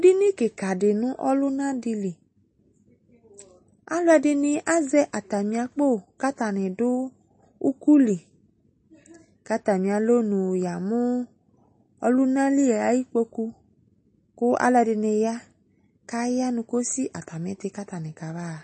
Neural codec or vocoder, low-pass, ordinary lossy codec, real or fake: none; 10.8 kHz; MP3, 32 kbps; real